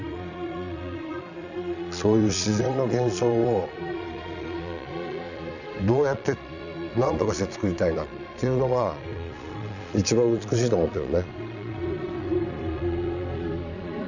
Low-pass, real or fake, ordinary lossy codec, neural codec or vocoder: 7.2 kHz; fake; none; vocoder, 22.05 kHz, 80 mel bands, Vocos